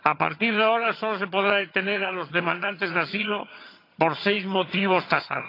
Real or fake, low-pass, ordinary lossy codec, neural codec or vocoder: fake; 5.4 kHz; AAC, 32 kbps; vocoder, 22.05 kHz, 80 mel bands, HiFi-GAN